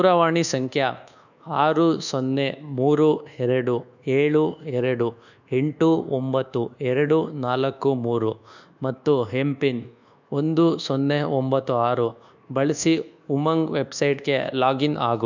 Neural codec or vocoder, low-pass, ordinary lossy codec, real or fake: codec, 24 kHz, 1.2 kbps, DualCodec; 7.2 kHz; none; fake